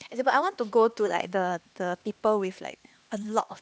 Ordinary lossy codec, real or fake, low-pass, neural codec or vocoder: none; fake; none; codec, 16 kHz, 2 kbps, X-Codec, HuBERT features, trained on LibriSpeech